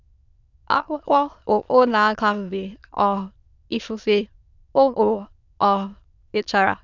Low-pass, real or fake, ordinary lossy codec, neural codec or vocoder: 7.2 kHz; fake; none; autoencoder, 22.05 kHz, a latent of 192 numbers a frame, VITS, trained on many speakers